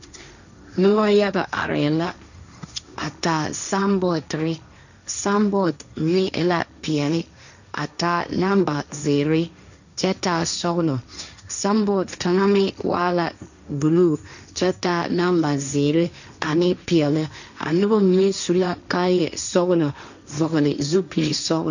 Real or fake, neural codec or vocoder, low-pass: fake; codec, 16 kHz, 1.1 kbps, Voila-Tokenizer; 7.2 kHz